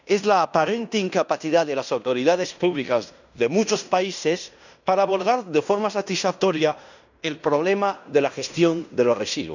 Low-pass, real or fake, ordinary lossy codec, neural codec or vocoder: 7.2 kHz; fake; none; codec, 16 kHz in and 24 kHz out, 0.9 kbps, LongCat-Audio-Codec, fine tuned four codebook decoder